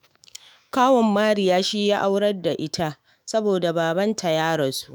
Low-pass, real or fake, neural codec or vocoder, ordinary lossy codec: none; fake; autoencoder, 48 kHz, 128 numbers a frame, DAC-VAE, trained on Japanese speech; none